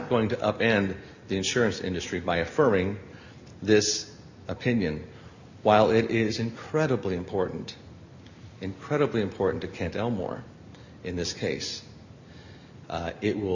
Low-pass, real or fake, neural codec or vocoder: 7.2 kHz; real; none